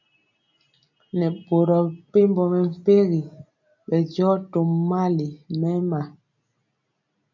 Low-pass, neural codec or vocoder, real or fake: 7.2 kHz; none; real